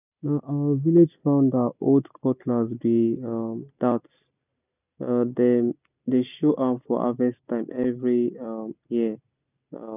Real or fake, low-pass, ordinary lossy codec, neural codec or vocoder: real; 3.6 kHz; none; none